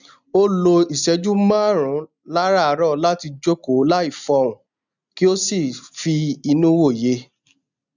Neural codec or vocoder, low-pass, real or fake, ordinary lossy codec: none; 7.2 kHz; real; none